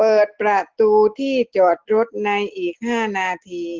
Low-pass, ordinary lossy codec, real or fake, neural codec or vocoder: 7.2 kHz; Opus, 16 kbps; real; none